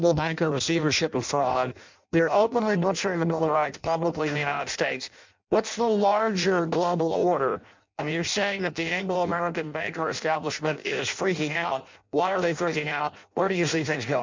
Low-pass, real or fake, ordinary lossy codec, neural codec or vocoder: 7.2 kHz; fake; MP3, 64 kbps; codec, 16 kHz in and 24 kHz out, 0.6 kbps, FireRedTTS-2 codec